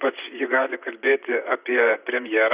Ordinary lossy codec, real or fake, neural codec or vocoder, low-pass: Opus, 64 kbps; fake; vocoder, 44.1 kHz, 128 mel bands, Pupu-Vocoder; 3.6 kHz